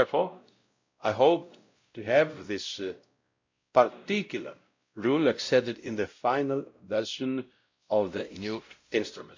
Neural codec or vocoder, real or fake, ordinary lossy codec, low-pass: codec, 16 kHz, 0.5 kbps, X-Codec, WavLM features, trained on Multilingual LibriSpeech; fake; MP3, 48 kbps; 7.2 kHz